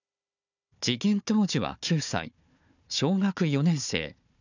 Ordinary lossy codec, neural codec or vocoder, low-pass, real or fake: none; codec, 16 kHz, 4 kbps, FunCodec, trained on Chinese and English, 50 frames a second; 7.2 kHz; fake